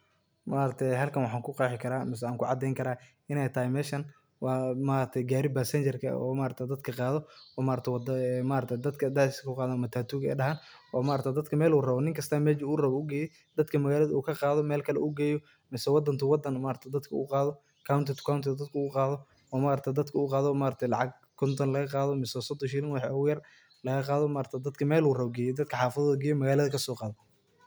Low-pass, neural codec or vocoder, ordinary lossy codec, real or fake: none; none; none; real